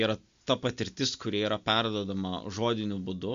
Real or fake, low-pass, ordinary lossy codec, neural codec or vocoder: real; 7.2 kHz; MP3, 64 kbps; none